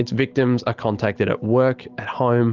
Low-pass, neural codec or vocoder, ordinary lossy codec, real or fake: 7.2 kHz; none; Opus, 32 kbps; real